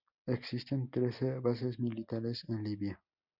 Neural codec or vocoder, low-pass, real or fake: none; 5.4 kHz; real